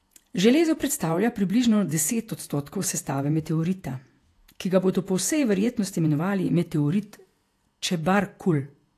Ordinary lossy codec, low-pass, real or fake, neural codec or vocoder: AAC, 64 kbps; 14.4 kHz; fake; vocoder, 48 kHz, 128 mel bands, Vocos